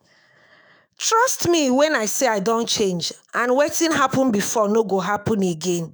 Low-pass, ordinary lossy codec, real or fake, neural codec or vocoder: none; none; fake; autoencoder, 48 kHz, 128 numbers a frame, DAC-VAE, trained on Japanese speech